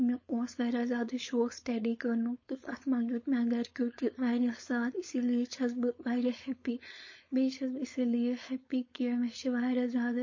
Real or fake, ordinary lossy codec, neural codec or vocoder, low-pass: fake; MP3, 32 kbps; codec, 16 kHz, 4.8 kbps, FACodec; 7.2 kHz